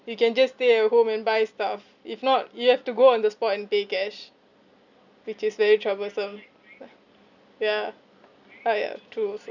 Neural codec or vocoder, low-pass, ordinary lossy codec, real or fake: none; 7.2 kHz; none; real